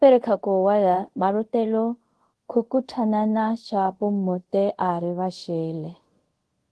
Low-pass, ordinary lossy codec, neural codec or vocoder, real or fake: 10.8 kHz; Opus, 16 kbps; codec, 24 kHz, 0.5 kbps, DualCodec; fake